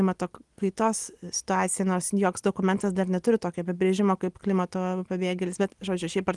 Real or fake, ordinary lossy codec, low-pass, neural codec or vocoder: real; Opus, 24 kbps; 10.8 kHz; none